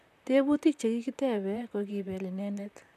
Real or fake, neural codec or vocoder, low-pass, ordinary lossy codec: fake; vocoder, 44.1 kHz, 128 mel bands, Pupu-Vocoder; 14.4 kHz; none